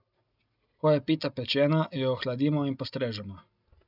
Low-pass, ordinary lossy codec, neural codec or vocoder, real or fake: 5.4 kHz; none; none; real